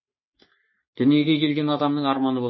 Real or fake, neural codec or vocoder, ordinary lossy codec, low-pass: fake; codec, 44.1 kHz, 3.4 kbps, Pupu-Codec; MP3, 24 kbps; 7.2 kHz